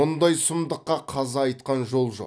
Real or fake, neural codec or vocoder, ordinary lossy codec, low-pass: real; none; none; none